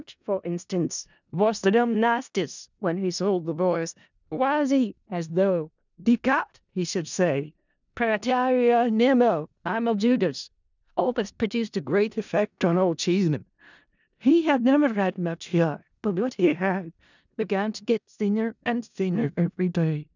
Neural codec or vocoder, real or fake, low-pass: codec, 16 kHz in and 24 kHz out, 0.4 kbps, LongCat-Audio-Codec, four codebook decoder; fake; 7.2 kHz